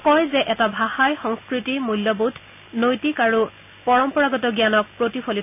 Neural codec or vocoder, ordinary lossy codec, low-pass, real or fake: none; none; 3.6 kHz; real